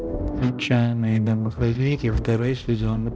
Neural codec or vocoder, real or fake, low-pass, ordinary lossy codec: codec, 16 kHz, 0.5 kbps, X-Codec, HuBERT features, trained on balanced general audio; fake; none; none